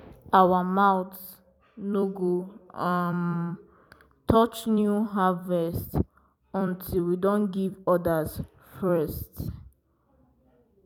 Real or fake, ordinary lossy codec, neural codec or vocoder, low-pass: fake; none; vocoder, 44.1 kHz, 128 mel bands every 256 samples, BigVGAN v2; 19.8 kHz